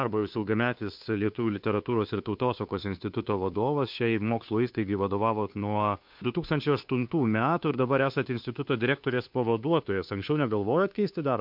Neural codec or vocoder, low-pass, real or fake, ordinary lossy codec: codec, 16 kHz, 2 kbps, FunCodec, trained on Chinese and English, 25 frames a second; 5.4 kHz; fake; MP3, 48 kbps